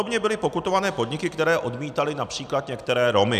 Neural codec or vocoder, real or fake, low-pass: none; real; 14.4 kHz